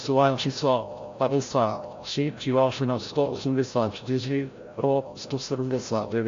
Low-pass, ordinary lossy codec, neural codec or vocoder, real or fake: 7.2 kHz; AAC, 48 kbps; codec, 16 kHz, 0.5 kbps, FreqCodec, larger model; fake